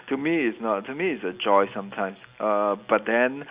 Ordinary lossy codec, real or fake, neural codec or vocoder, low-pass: Opus, 64 kbps; real; none; 3.6 kHz